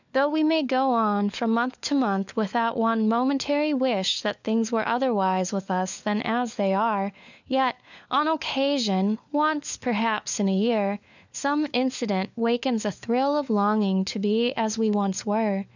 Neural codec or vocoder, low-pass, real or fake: codec, 16 kHz, 4 kbps, FunCodec, trained on LibriTTS, 50 frames a second; 7.2 kHz; fake